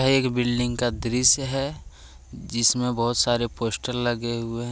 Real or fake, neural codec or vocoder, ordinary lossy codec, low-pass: real; none; none; none